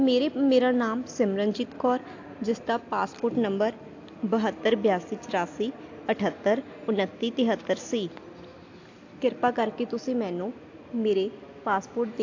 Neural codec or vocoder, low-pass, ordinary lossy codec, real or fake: none; 7.2 kHz; AAC, 48 kbps; real